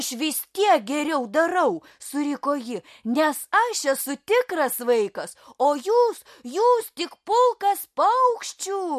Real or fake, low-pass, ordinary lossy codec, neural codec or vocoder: real; 14.4 kHz; MP3, 64 kbps; none